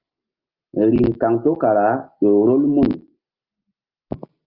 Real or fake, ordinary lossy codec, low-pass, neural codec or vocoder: real; Opus, 24 kbps; 5.4 kHz; none